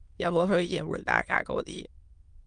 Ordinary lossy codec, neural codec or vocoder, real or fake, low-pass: Opus, 32 kbps; autoencoder, 22.05 kHz, a latent of 192 numbers a frame, VITS, trained on many speakers; fake; 9.9 kHz